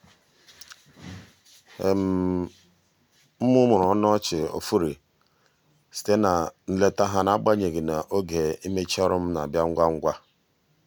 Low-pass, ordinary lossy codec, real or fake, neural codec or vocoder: none; none; real; none